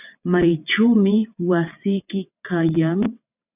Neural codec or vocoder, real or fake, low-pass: vocoder, 22.05 kHz, 80 mel bands, WaveNeXt; fake; 3.6 kHz